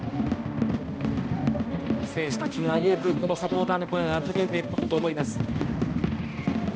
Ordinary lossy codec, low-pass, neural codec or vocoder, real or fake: none; none; codec, 16 kHz, 1 kbps, X-Codec, HuBERT features, trained on balanced general audio; fake